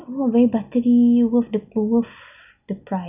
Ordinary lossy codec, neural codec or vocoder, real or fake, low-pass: none; none; real; 3.6 kHz